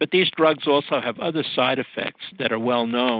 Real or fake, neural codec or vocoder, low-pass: real; none; 5.4 kHz